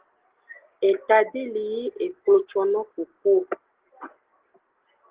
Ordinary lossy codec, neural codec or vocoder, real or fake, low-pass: Opus, 16 kbps; none; real; 3.6 kHz